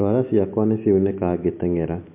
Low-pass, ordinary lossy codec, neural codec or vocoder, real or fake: 3.6 kHz; MP3, 32 kbps; none; real